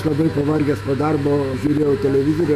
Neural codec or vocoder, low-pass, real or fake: autoencoder, 48 kHz, 128 numbers a frame, DAC-VAE, trained on Japanese speech; 14.4 kHz; fake